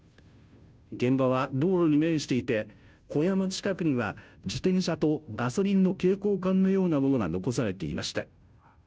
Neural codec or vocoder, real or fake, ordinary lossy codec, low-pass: codec, 16 kHz, 0.5 kbps, FunCodec, trained on Chinese and English, 25 frames a second; fake; none; none